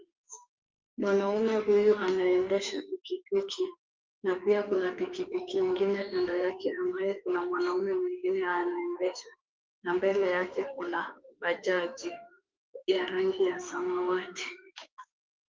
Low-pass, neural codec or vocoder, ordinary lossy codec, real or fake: 7.2 kHz; autoencoder, 48 kHz, 32 numbers a frame, DAC-VAE, trained on Japanese speech; Opus, 32 kbps; fake